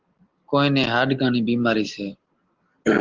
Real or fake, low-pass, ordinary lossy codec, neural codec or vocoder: real; 7.2 kHz; Opus, 16 kbps; none